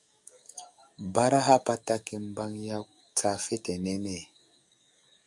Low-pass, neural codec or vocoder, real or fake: 10.8 kHz; codec, 44.1 kHz, 7.8 kbps, DAC; fake